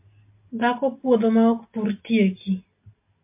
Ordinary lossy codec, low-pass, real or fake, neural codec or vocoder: MP3, 24 kbps; 3.6 kHz; real; none